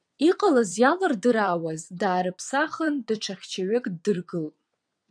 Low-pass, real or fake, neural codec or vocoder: 9.9 kHz; fake; vocoder, 22.05 kHz, 80 mel bands, WaveNeXt